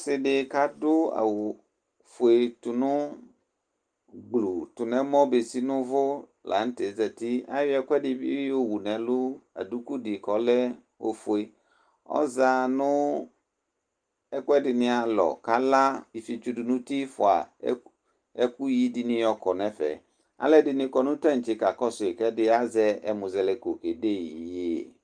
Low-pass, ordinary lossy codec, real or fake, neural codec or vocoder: 9.9 kHz; Opus, 32 kbps; real; none